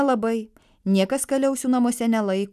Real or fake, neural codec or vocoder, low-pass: real; none; 14.4 kHz